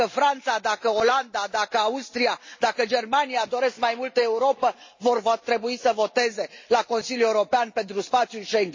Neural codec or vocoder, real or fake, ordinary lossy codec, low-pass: none; real; MP3, 32 kbps; 7.2 kHz